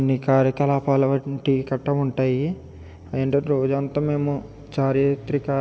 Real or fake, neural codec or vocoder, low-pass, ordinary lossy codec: real; none; none; none